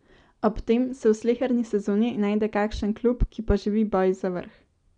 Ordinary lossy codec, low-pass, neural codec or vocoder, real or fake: none; 9.9 kHz; none; real